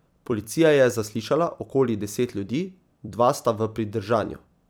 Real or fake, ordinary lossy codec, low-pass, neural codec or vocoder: fake; none; none; vocoder, 44.1 kHz, 128 mel bands every 256 samples, BigVGAN v2